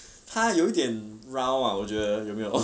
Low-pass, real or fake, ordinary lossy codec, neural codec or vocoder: none; real; none; none